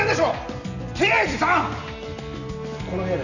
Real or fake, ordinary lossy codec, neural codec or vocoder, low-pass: real; none; none; 7.2 kHz